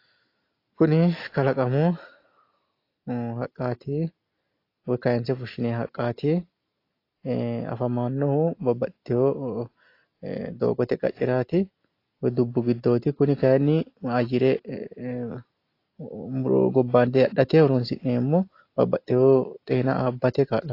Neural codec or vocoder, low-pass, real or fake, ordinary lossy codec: none; 5.4 kHz; real; AAC, 32 kbps